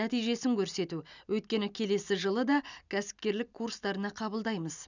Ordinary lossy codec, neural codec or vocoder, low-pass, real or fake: none; none; 7.2 kHz; real